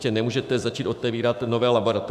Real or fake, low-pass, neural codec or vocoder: fake; 14.4 kHz; autoencoder, 48 kHz, 128 numbers a frame, DAC-VAE, trained on Japanese speech